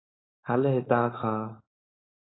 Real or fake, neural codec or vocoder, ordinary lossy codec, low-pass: fake; codec, 16 kHz, 4.8 kbps, FACodec; AAC, 16 kbps; 7.2 kHz